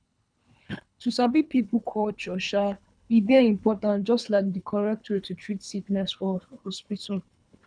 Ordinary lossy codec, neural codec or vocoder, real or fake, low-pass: none; codec, 24 kHz, 3 kbps, HILCodec; fake; 9.9 kHz